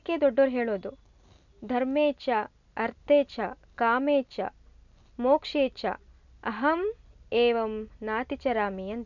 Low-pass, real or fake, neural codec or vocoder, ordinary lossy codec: 7.2 kHz; real; none; none